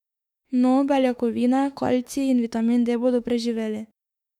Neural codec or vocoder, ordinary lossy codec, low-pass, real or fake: autoencoder, 48 kHz, 32 numbers a frame, DAC-VAE, trained on Japanese speech; none; 19.8 kHz; fake